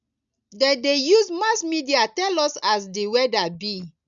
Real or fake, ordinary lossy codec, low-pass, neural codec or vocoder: real; none; 7.2 kHz; none